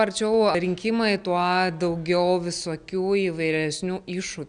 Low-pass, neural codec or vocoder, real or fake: 9.9 kHz; none; real